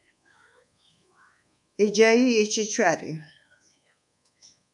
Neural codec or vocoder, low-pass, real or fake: codec, 24 kHz, 1.2 kbps, DualCodec; 10.8 kHz; fake